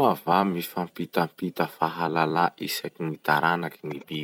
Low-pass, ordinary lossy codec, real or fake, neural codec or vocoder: none; none; fake; vocoder, 44.1 kHz, 128 mel bands every 256 samples, BigVGAN v2